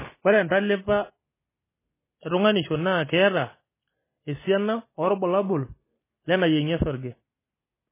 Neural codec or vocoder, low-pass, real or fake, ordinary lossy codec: none; 3.6 kHz; real; MP3, 16 kbps